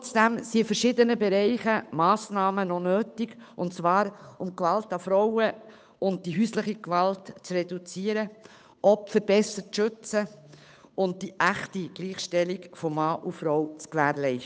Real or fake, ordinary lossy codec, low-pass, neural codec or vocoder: fake; none; none; codec, 16 kHz, 8 kbps, FunCodec, trained on Chinese and English, 25 frames a second